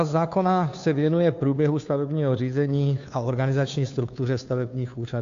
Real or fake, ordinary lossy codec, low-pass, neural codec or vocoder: fake; AAC, 64 kbps; 7.2 kHz; codec, 16 kHz, 2 kbps, FunCodec, trained on Chinese and English, 25 frames a second